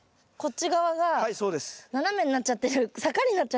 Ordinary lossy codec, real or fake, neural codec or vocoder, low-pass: none; real; none; none